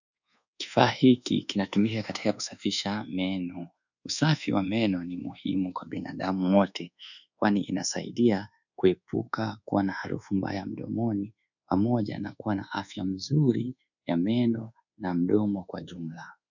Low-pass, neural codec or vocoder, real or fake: 7.2 kHz; codec, 24 kHz, 1.2 kbps, DualCodec; fake